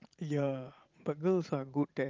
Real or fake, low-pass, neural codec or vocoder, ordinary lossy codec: fake; 7.2 kHz; vocoder, 44.1 kHz, 80 mel bands, Vocos; Opus, 32 kbps